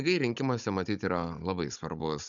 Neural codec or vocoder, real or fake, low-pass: codec, 16 kHz, 16 kbps, FunCodec, trained on Chinese and English, 50 frames a second; fake; 7.2 kHz